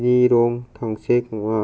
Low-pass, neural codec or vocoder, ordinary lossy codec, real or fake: none; none; none; real